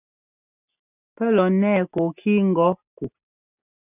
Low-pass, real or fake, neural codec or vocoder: 3.6 kHz; real; none